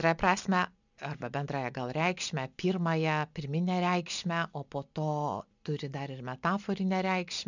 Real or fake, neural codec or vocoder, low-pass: real; none; 7.2 kHz